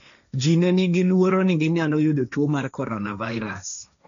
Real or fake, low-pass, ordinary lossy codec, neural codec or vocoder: fake; 7.2 kHz; none; codec, 16 kHz, 1.1 kbps, Voila-Tokenizer